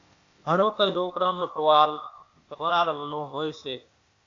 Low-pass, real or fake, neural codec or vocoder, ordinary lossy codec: 7.2 kHz; fake; codec, 16 kHz, 0.8 kbps, ZipCodec; AAC, 48 kbps